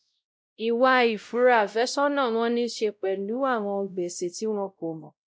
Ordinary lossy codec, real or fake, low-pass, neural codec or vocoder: none; fake; none; codec, 16 kHz, 0.5 kbps, X-Codec, WavLM features, trained on Multilingual LibriSpeech